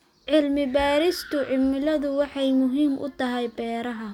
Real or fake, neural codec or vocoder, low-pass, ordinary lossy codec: real; none; 19.8 kHz; none